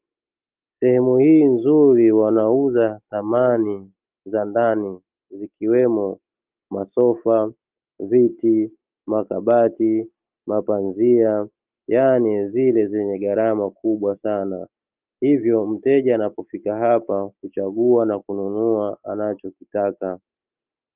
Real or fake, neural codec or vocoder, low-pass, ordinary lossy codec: real; none; 3.6 kHz; Opus, 32 kbps